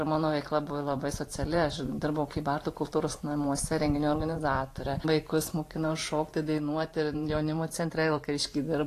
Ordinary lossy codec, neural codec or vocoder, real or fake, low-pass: AAC, 48 kbps; vocoder, 44.1 kHz, 128 mel bands every 512 samples, BigVGAN v2; fake; 14.4 kHz